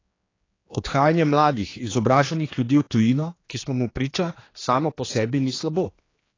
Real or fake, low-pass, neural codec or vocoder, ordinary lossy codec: fake; 7.2 kHz; codec, 16 kHz, 2 kbps, X-Codec, HuBERT features, trained on general audio; AAC, 32 kbps